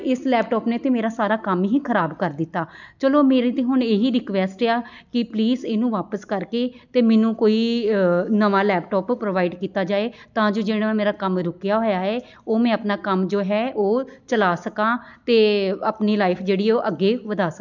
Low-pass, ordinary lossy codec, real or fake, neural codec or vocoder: 7.2 kHz; none; real; none